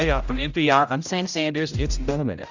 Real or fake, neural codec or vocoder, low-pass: fake; codec, 16 kHz, 0.5 kbps, X-Codec, HuBERT features, trained on general audio; 7.2 kHz